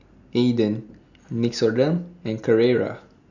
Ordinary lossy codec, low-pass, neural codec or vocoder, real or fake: none; 7.2 kHz; none; real